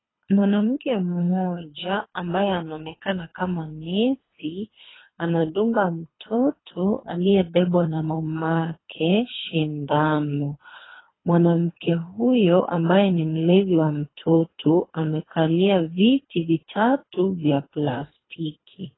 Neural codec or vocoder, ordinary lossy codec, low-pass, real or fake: codec, 24 kHz, 3 kbps, HILCodec; AAC, 16 kbps; 7.2 kHz; fake